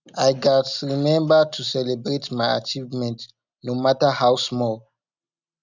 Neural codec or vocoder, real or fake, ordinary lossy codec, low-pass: none; real; none; 7.2 kHz